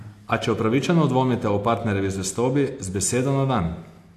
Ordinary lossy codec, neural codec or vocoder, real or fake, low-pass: AAC, 64 kbps; none; real; 14.4 kHz